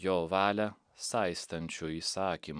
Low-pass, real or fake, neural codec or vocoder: 9.9 kHz; real; none